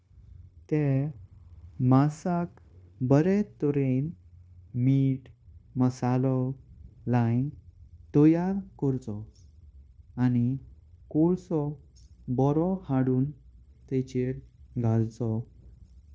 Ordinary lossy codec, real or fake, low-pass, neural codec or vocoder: none; fake; none; codec, 16 kHz, 0.9 kbps, LongCat-Audio-Codec